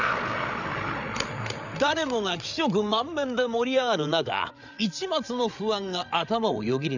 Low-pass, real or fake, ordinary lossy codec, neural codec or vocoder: 7.2 kHz; fake; none; codec, 16 kHz, 8 kbps, FreqCodec, larger model